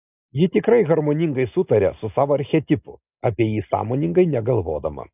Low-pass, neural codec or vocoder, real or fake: 3.6 kHz; none; real